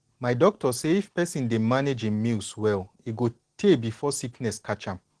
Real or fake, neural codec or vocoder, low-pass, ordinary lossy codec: real; none; 9.9 kHz; Opus, 16 kbps